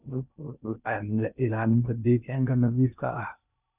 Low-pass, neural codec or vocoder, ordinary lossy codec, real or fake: 3.6 kHz; codec, 16 kHz in and 24 kHz out, 0.6 kbps, FocalCodec, streaming, 2048 codes; none; fake